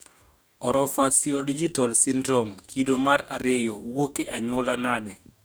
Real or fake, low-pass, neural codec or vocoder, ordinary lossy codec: fake; none; codec, 44.1 kHz, 2.6 kbps, DAC; none